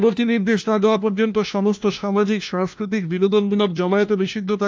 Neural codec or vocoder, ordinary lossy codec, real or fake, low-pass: codec, 16 kHz, 1 kbps, FunCodec, trained on LibriTTS, 50 frames a second; none; fake; none